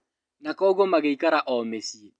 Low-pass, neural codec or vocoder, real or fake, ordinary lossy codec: 9.9 kHz; none; real; none